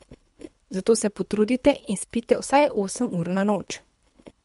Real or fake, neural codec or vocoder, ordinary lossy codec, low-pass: fake; codec, 24 kHz, 3 kbps, HILCodec; MP3, 64 kbps; 10.8 kHz